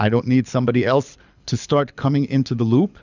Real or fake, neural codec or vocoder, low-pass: fake; codec, 24 kHz, 6 kbps, HILCodec; 7.2 kHz